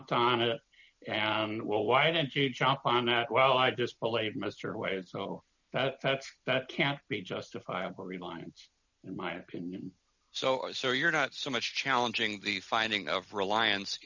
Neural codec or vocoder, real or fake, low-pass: none; real; 7.2 kHz